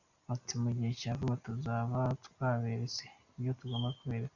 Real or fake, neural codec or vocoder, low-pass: real; none; 7.2 kHz